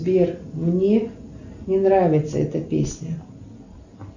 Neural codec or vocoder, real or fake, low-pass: none; real; 7.2 kHz